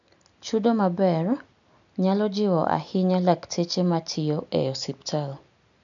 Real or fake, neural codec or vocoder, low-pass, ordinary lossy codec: real; none; 7.2 kHz; MP3, 96 kbps